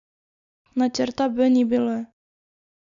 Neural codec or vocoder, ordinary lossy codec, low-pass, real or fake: none; none; 7.2 kHz; real